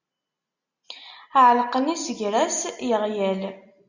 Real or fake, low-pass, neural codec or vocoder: real; 7.2 kHz; none